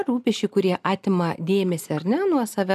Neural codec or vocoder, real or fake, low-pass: none; real; 14.4 kHz